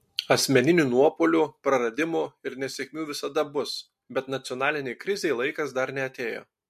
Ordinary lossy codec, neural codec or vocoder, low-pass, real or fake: MP3, 64 kbps; none; 14.4 kHz; real